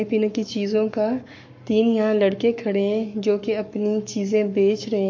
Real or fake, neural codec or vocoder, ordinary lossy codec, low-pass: fake; codec, 44.1 kHz, 7.8 kbps, Pupu-Codec; MP3, 48 kbps; 7.2 kHz